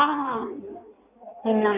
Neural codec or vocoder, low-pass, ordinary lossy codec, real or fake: codec, 16 kHz in and 24 kHz out, 1.1 kbps, FireRedTTS-2 codec; 3.6 kHz; none; fake